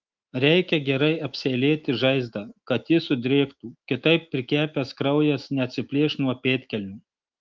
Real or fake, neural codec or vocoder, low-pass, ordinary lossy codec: fake; autoencoder, 48 kHz, 128 numbers a frame, DAC-VAE, trained on Japanese speech; 7.2 kHz; Opus, 32 kbps